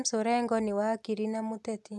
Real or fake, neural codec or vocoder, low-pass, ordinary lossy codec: real; none; none; none